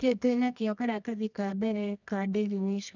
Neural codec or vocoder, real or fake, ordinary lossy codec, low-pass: codec, 24 kHz, 0.9 kbps, WavTokenizer, medium music audio release; fake; none; 7.2 kHz